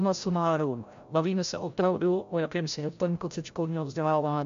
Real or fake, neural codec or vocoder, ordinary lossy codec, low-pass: fake; codec, 16 kHz, 0.5 kbps, FreqCodec, larger model; MP3, 64 kbps; 7.2 kHz